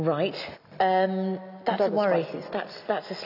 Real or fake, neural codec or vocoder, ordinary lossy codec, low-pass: real; none; none; 5.4 kHz